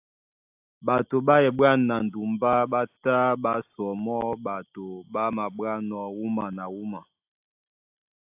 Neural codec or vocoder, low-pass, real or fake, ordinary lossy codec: none; 3.6 kHz; real; AAC, 32 kbps